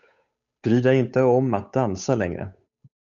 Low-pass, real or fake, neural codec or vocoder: 7.2 kHz; fake; codec, 16 kHz, 8 kbps, FunCodec, trained on Chinese and English, 25 frames a second